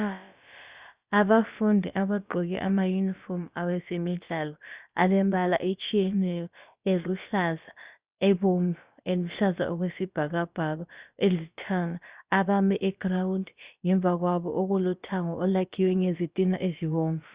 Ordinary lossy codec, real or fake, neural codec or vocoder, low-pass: Opus, 64 kbps; fake; codec, 16 kHz, about 1 kbps, DyCAST, with the encoder's durations; 3.6 kHz